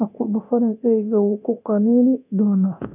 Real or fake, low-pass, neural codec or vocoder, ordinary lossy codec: fake; 3.6 kHz; codec, 24 kHz, 0.9 kbps, DualCodec; none